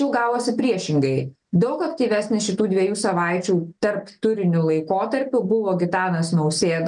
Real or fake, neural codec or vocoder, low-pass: real; none; 9.9 kHz